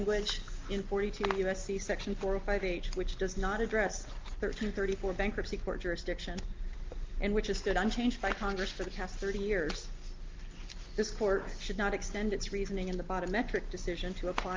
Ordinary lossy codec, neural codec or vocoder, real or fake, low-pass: Opus, 32 kbps; none; real; 7.2 kHz